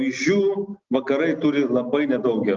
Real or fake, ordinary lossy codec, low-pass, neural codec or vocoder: real; Opus, 32 kbps; 7.2 kHz; none